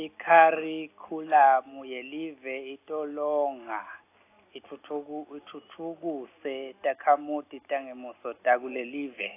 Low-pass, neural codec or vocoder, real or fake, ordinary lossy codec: 3.6 kHz; none; real; AAC, 24 kbps